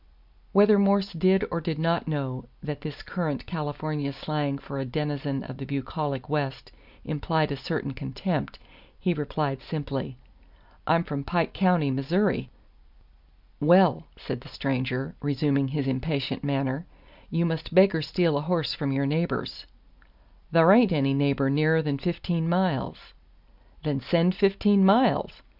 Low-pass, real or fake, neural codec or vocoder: 5.4 kHz; real; none